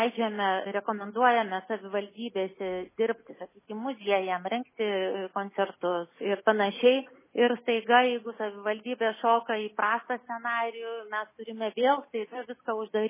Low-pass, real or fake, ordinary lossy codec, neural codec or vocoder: 3.6 kHz; real; MP3, 16 kbps; none